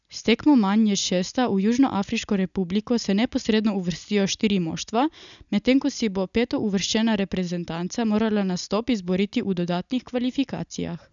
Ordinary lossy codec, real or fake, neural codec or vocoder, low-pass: none; real; none; 7.2 kHz